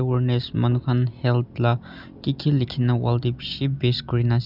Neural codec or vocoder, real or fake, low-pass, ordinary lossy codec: none; real; 5.4 kHz; none